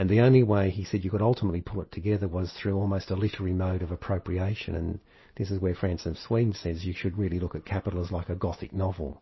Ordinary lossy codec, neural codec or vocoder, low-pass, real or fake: MP3, 24 kbps; none; 7.2 kHz; real